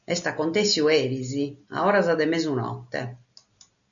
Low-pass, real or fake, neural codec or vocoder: 7.2 kHz; real; none